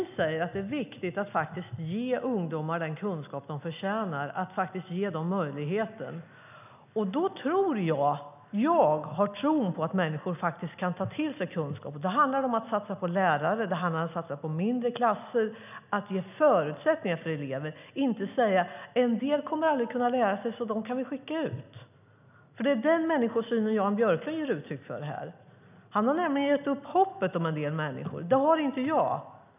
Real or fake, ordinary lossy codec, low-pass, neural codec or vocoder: real; none; 3.6 kHz; none